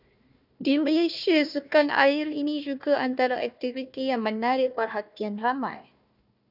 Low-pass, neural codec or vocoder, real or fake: 5.4 kHz; codec, 16 kHz, 1 kbps, FunCodec, trained on Chinese and English, 50 frames a second; fake